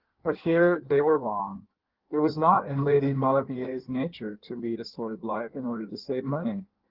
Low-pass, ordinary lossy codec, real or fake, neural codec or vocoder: 5.4 kHz; Opus, 16 kbps; fake; codec, 16 kHz in and 24 kHz out, 1.1 kbps, FireRedTTS-2 codec